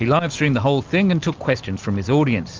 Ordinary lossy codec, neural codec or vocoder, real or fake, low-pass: Opus, 24 kbps; none; real; 7.2 kHz